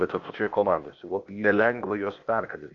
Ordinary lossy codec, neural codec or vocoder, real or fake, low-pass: Opus, 64 kbps; codec, 16 kHz, 0.8 kbps, ZipCodec; fake; 7.2 kHz